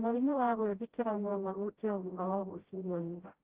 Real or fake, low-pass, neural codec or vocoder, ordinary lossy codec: fake; 3.6 kHz; codec, 16 kHz, 0.5 kbps, FreqCodec, smaller model; Opus, 16 kbps